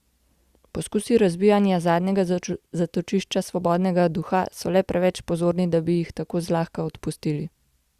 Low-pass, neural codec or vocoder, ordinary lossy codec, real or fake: 14.4 kHz; none; Opus, 64 kbps; real